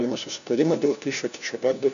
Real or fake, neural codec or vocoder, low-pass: fake; codec, 16 kHz, 1 kbps, FunCodec, trained on LibriTTS, 50 frames a second; 7.2 kHz